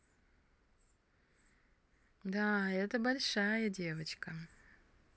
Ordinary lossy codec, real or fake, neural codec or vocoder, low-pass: none; real; none; none